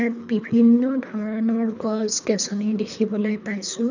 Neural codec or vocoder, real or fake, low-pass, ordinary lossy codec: codec, 24 kHz, 3 kbps, HILCodec; fake; 7.2 kHz; none